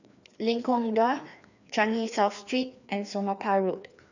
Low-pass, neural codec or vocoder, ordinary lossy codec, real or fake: 7.2 kHz; codec, 16 kHz, 2 kbps, FreqCodec, larger model; none; fake